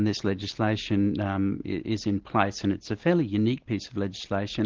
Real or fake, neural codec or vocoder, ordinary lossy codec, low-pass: real; none; Opus, 16 kbps; 7.2 kHz